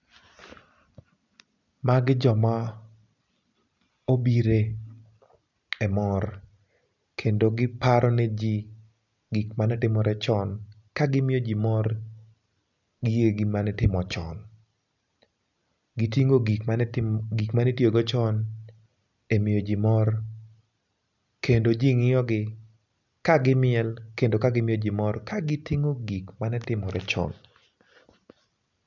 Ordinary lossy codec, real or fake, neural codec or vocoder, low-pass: none; real; none; 7.2 kHz